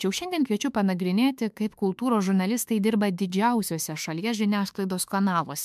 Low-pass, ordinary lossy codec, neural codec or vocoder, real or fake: 14.4 kHz; MP3, 96 kbps; autoencoder, 48 kHz, 32 numbers a frame, DAC-VAE, trained on Japanese speech; fake